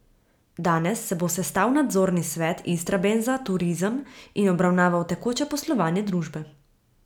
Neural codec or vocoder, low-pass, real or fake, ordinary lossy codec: none; 19.8 kHz; real; none